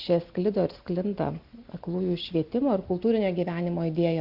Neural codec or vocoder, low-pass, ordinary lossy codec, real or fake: none; 5.4 kHz; Opus, 64 kbps; real